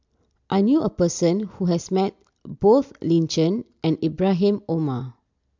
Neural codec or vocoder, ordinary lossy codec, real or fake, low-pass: none; MP3, 64 kbps; real; 7.2 kHz